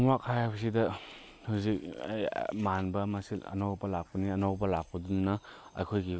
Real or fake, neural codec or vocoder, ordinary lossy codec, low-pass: real; none; none; none